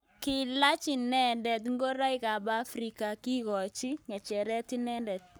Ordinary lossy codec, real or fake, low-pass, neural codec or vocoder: none; fake; none; codec, 44.1 kHz, 7.8 kbps, Pupu-Codec